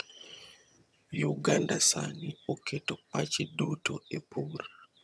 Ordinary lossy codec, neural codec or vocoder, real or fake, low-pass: none; vocoder, 22.05 kHz, 80 mel bands, HiFi-GAN; fake; none